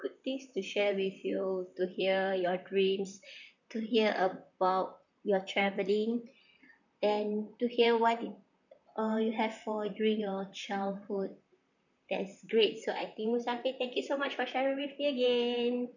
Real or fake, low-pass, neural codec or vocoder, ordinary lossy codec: fake; 7.2 kHz; vocoder, 44.1 kHz, 128 mel bands, Pupu-Vocoder; none